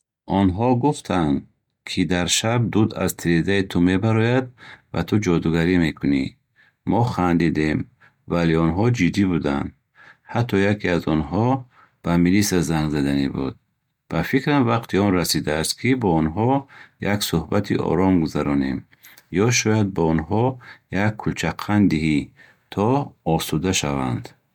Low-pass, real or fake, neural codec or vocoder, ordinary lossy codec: 19.8 kHz; real; none; MP3, 96 kbps